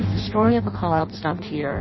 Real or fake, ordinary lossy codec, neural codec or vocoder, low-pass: fake; MP3, 24 kbps; codec, 16 kHz in and 24 kHz out, 0.6 kbps, FireRedTTS-2 codec; 7.2 kHz